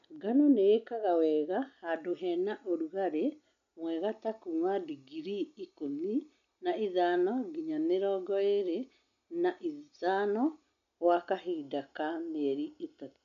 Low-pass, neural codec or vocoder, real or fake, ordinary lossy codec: 7.2 kHz; none; real; none